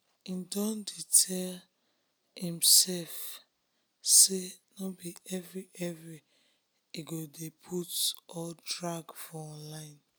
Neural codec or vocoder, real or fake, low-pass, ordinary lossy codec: none; real; none; none